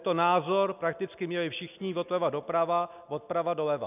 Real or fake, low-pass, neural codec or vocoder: real; 3.6 kHz; none